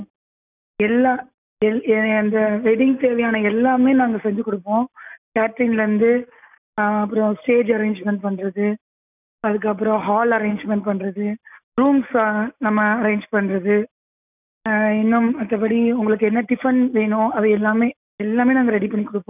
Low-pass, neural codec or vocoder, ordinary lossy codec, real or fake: 3.6 kHz; none; none; real